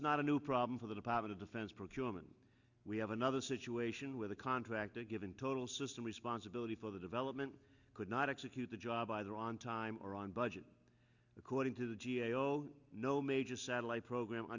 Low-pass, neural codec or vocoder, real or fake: 7.2 kHz; none; real